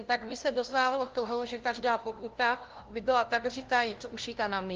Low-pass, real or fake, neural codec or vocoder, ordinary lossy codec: 7.2 kHz; fake; codec, 16 kHz, 0.5 kbps, FunCodec, trained on LibriTTS, 25 frames a second; Opus, 16 kbps